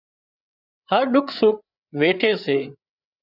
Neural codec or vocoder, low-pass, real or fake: codec, 16 kHz, 8 kbps, FreqCodec, larger model; 5.4 kHz; fake